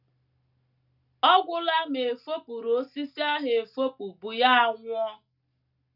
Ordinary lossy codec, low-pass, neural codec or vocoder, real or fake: none; 5.4 kHz; none; real